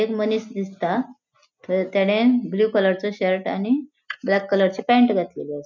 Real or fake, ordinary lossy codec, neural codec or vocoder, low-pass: real; none; none; 7.2 kHz